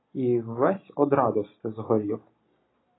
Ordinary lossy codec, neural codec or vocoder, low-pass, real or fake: AAC, 16 kbps; none; 7.2 kHz; real